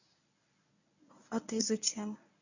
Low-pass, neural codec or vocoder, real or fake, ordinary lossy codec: 7.2 kHz; codec, 24 kHz, 0.9 kbps, WavTokenizer, medium speech release version 1; fake; none